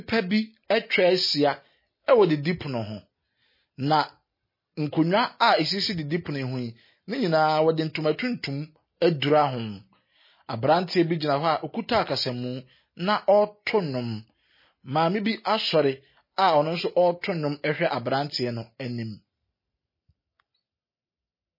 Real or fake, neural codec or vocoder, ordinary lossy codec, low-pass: real; none; MP3, 24 kbps; 5.4 kHz